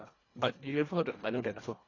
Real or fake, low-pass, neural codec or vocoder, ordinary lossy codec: fake; 7.2 kHz; codec, 24 kHz, 1.5 kbps, HILCodec; AAC, 32 kbps